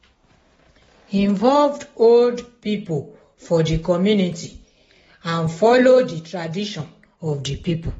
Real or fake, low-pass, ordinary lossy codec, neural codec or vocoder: real; 19.8 kHz; AAC, 24 kbps; none